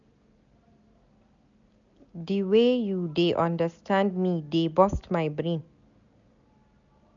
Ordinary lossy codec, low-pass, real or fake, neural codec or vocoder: none; 7.2 kHz; real; none